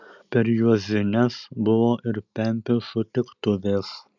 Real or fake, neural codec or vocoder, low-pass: real; none; 7.2 kHz